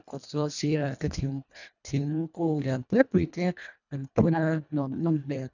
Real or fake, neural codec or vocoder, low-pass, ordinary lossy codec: fake; codec, 24 kHz, 1.5 kbps, HILCodec; 7.2 kHz; none